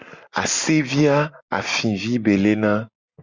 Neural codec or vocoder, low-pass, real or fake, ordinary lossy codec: none; 7.2 kHz; real; Opus, 64 kbps